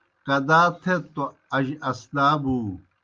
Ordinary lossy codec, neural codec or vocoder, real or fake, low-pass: Opus, 24 kbps; none; real; 7.2 kHz